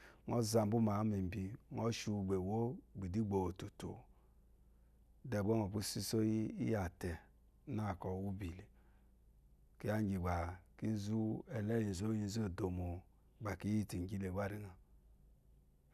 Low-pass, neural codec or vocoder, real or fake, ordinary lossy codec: 14.4 kHz; none; real; none